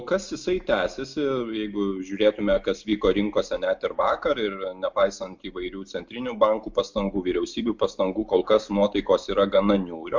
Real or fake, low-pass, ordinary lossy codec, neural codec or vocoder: real; 7.2 kHz; MP3, 64 kbps; none